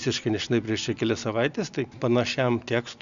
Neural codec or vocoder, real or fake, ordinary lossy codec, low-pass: none; real; Opus, 64 kbps; 7.2 kHz